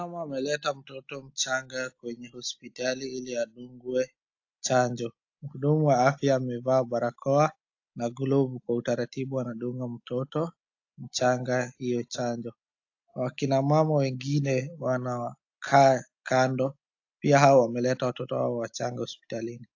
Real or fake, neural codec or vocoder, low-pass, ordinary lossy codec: real; none; 7.2 kHz; AAC, 48 kbps